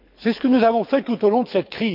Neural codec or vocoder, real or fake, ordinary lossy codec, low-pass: codec, 24 kHz, 6 kbps, HILCodec; fake; MP3, 48 kbps; 5.4 kHz